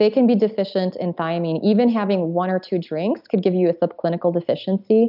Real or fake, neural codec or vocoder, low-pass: real; none; 5.4 kHz